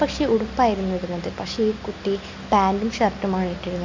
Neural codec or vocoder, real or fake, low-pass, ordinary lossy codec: none; real; 7.2 kHz; MP3, 48 kbps